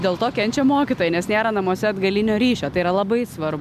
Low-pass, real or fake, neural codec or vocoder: 14.4 kHz; real; none